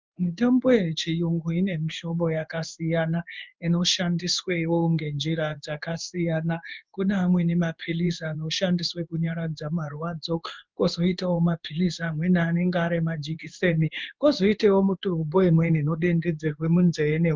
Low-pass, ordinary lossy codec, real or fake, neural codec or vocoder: 7.2 kHz; Opus, 24 kbps; fake; codec, 16 kHz in and 24 kHz out, 1 kbps, XY-Tokenizer